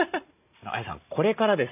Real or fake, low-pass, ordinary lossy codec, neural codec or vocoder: real; 3.6 kHz; none; none